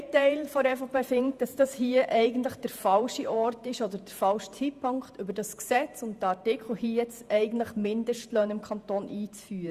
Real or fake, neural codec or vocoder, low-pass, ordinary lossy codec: fake; vocoder, 48 kHz, 128 mel bands, Vocos; 14.4 kHz; Opus, 64 kbps